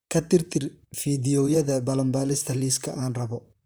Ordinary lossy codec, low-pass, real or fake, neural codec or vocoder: none; none; fake; vocoder, 44.1 kHz, 128 mel bands, Pupu-Vocoder